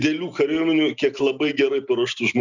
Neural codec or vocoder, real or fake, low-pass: none; real; 7.2 kHz